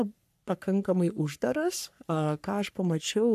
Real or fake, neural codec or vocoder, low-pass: fake; codec, 44.1 kHz, 3.4 kbps, Pupu-Codec; 14.4 kHz